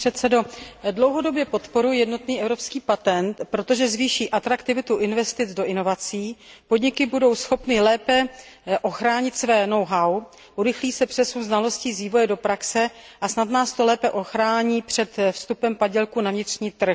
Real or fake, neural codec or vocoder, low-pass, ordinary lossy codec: real; none; none; none